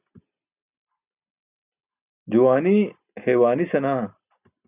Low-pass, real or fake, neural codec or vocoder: 3.6 kHz; real; none